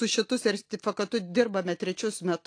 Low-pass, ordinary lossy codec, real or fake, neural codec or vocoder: 9.9 kHz; AAC, 48 kbps; real; none